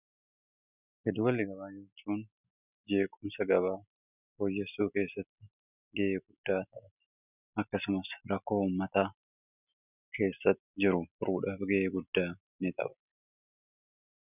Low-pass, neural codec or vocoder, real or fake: 3.6 kHz; none; real